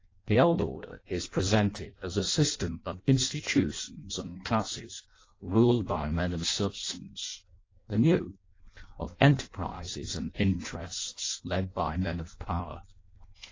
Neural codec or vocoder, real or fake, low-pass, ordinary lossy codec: codec, 16 kHz in and 24 kHz out, 0.6 kbps, FireRedTTS-2 codec; fake; 7.2 kHz; AAC, 32 kbps